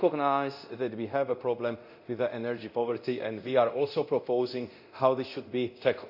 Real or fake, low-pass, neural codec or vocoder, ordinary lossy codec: fake; 5.4 kHz; codec, 24 kHz, 0.9 kbps, DualCodec; none